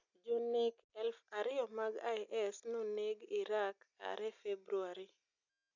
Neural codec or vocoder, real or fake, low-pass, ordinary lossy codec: none; real; 7.2 kHz; none